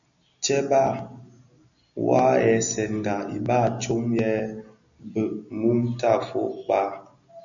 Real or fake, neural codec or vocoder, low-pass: real; none; 7.2 kHz